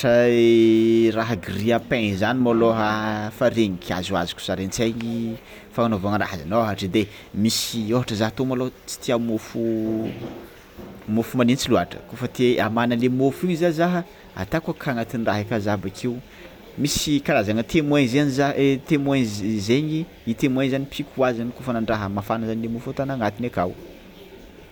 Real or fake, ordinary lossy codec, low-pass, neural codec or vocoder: real; none; none; none